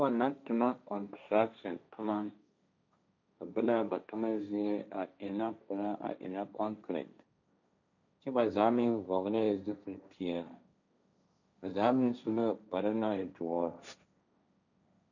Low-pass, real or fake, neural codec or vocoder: 7.2 kHz; fake; codec, 16 kHz, 1.1 kbps, Voila-Tokenizer